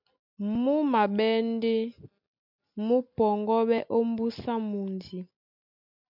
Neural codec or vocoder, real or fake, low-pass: none; real; 5.4 kHz